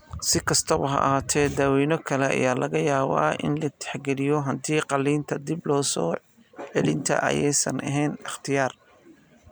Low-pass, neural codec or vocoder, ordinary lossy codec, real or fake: none; none; none; real